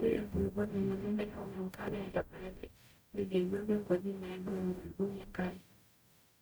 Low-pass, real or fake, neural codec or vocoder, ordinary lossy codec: none; fake; codec, 44.1 kHz, 0.9 kbps, DAC; none